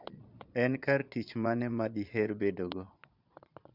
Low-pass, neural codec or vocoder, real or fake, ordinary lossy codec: 5.4 kHz; vocoder, 22.05 kHz, 80 mel bands, Vocos; fake; none